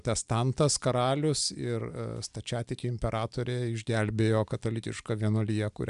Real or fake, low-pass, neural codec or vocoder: real; 10.8 kHz; none